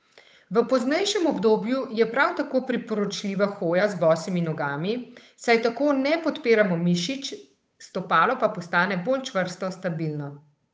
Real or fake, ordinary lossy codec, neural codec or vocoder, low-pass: fake; none; codec, 16 kHz, 8 kbps, FunCodec, trained on Chinese and English, 25 frames a second; none